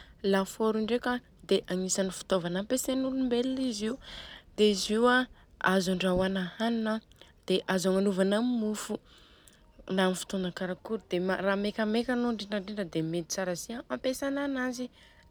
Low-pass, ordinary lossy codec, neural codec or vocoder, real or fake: none; none; none; real